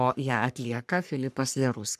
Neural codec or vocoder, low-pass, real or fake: codec, 44.1 kHz, 3.4 kbps, Pupu-Codec; 14.4 kHz; fake